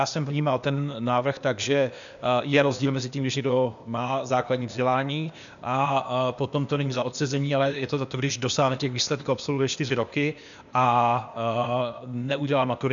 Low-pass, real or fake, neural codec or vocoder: 7.2 kHz; fake; codec, 16 kHz, 0.8 kbps, ZipCodec